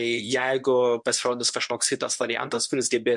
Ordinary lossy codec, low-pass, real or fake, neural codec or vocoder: MP3, 64 kbps; 10.8 kHz; fake; codec, 24 kHz, 0.9 kbps, WavTokenizer, medium speech release version 1